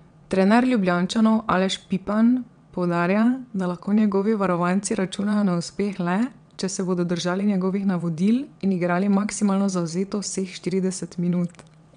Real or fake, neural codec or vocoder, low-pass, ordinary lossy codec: fake; vocoder, 22.05 kHz, 80 mel bands, WaveNeXt; 9.9 kHz; none